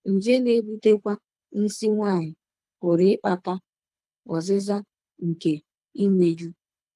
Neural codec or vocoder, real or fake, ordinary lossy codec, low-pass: codec, 24 kHz, 3 kbps, HILCodec; fake; none; none